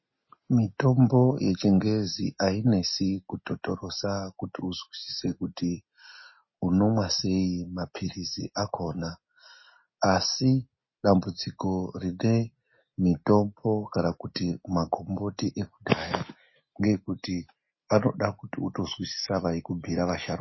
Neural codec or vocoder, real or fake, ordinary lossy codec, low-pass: none; real; MP3, 24 kbps; 7.2 kHz